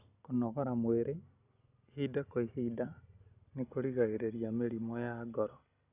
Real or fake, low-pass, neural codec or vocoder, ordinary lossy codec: real; 3.6 kHz; none; none